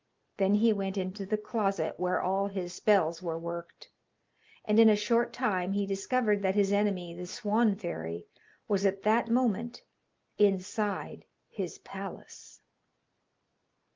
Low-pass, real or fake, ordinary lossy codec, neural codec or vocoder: 7.2 kHz; real; Opus, 24 kbps; none